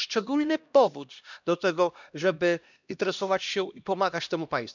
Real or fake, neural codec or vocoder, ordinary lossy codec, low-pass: fake; codec, 16 kHz, 1 kbps, X-Codec, HuBERT features, trained on LibriSpeech; none; 7.2 kHz